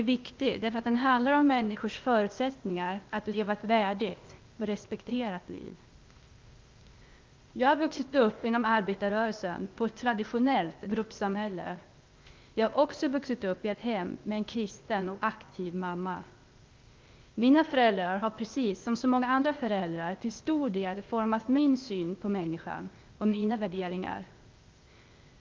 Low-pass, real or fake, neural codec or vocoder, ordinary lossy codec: 7.2 kHz; fake; codec, 16 kHz, 0.8 kbps, ZipCodec; Opus, 24 kbps